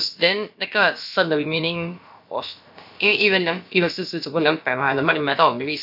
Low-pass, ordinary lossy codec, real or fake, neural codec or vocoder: 5.4 kHz; none; fake; codec, 16 kHz, about 1 kbps, DyCAST, with the encoder's durations